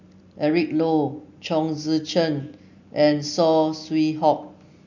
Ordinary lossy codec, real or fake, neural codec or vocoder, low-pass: none; real; none; 7.2 kHz